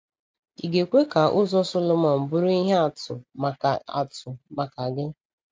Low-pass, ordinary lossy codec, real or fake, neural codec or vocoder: none; none; real; none